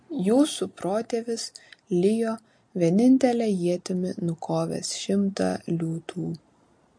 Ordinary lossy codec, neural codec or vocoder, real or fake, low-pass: MP3, 48 kbps; none; real; 9.9 kHz